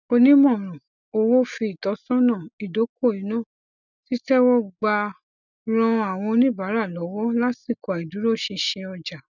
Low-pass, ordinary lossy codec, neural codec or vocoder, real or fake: 7.2 kHz; none; none; real